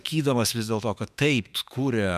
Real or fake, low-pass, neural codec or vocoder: fake; 14.4 kHz; autoencoder, 48 kHz, 32 numbers a frame, DAC-VAE, trained on Japanese speech